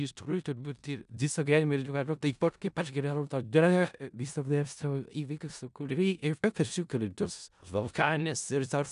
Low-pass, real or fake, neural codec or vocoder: 10.8 kHz; fake; codec, 16 kHz in and 24 kHz out, 0.4 kbps, LongCat-Audio-Codec, four codebook decoder